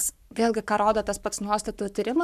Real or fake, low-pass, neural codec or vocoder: fake; 14.4 kHz; codec, 44.1 kHz, 7.8 kbps, Pupu-Codec